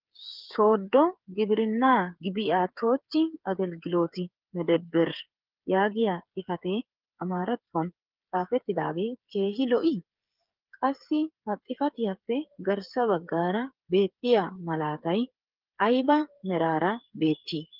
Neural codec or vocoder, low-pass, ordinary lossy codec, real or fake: codec, 16 kHz, 8 kbps, FreqCodec, smaller model; 5.4 kHz; Opus, 32 kbps; fake